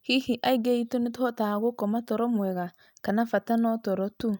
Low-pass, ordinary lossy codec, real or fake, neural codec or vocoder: none; none; real; none